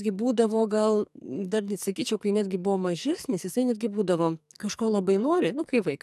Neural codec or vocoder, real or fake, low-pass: codec, 44.1 kHz, 2.6 kbps, SNAC; fake; 14.4 kHz